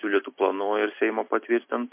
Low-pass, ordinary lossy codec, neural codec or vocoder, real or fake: 3.6 kHz; MP3, 24 kbps; none; real